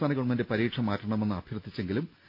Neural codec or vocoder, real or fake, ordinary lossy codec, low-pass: none; real; none; 5.4 kHz